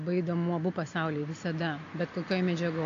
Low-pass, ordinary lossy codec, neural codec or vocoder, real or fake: 7.2 kHz; AAC, 48 kbps; none; real